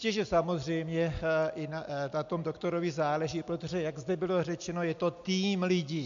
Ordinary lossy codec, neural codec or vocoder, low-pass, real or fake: MP3, 64 kbps; none; 7.2 kHz; real